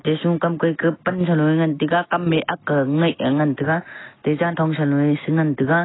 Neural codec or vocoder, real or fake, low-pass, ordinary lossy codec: none; real; 7.2 kHz; AAC, 16 kbps